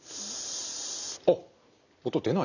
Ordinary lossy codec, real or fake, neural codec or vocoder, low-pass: none; real; none; 7.2 kHz